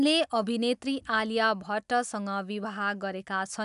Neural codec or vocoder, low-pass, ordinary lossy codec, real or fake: none; 10.8 kHz; none; real